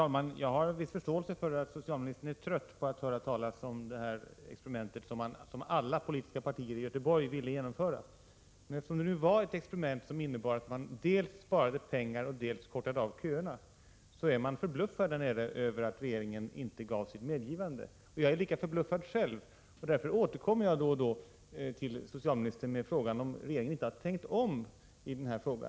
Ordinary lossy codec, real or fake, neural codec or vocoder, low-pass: none; real; none; none